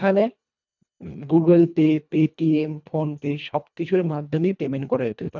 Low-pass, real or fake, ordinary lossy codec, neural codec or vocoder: 7.2 kHz; fake; none; codec, 24 kHz, 1.5 kbps, HILCodec